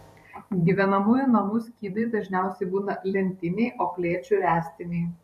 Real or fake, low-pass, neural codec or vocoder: fake; 14.4 kHz; vocoder, 44.1 kHz, 128 mel bands every 512 samples, BigVGAN v2